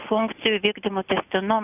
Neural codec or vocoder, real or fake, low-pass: none; real; 3.6 kHz